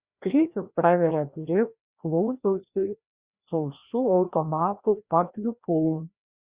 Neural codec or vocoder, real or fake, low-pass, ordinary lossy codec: codec, 16 kHz, 1 kbps, FreqCodec, larger model; fake; 3.6 kHz; Opus, 64 kbps